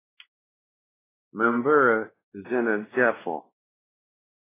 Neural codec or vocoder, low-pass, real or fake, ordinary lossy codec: codec, 16 kHz, 1 kbps, X-Codec, WavLM features, trained on Multilingual LibriSpeech; 3.6 kHz; fake; AAC, 16 kbps